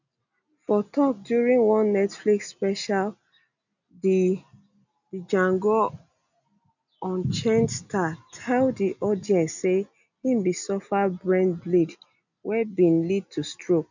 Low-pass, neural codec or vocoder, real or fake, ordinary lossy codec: 7.2 kHz; none; real; none